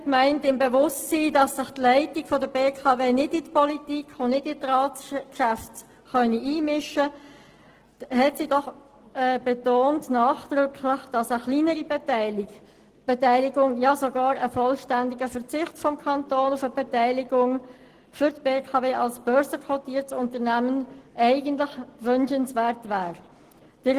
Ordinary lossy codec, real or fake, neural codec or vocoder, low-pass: Opus, 16 kbps; real; none; 14.4 kHz